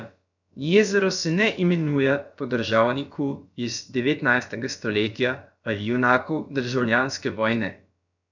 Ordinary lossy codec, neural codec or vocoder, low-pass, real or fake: none; codec, 16 kHz, about 1 kbps, DyCAST, with the encoder's durations; 7.2 kHz; fake